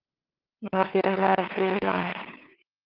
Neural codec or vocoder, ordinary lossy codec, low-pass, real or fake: codec, 16 kHz, 2 kbps, FunCodec, trained on LibriTTS, 25 frames a second; Opus, 24 kbps; 5.4 kHz; fake